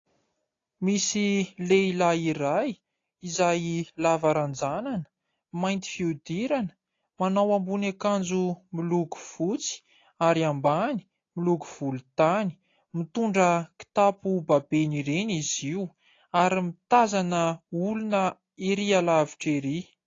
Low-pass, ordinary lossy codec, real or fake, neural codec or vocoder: 7.2 kHz; AAC, 32 kbps; real; none